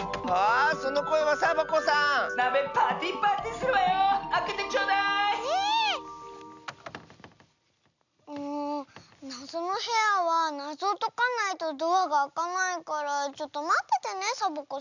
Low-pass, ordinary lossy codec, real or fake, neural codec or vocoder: 7.2 kHz; none; real; none